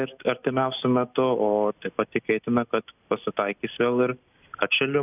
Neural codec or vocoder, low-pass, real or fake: none; 3.6 kHz; real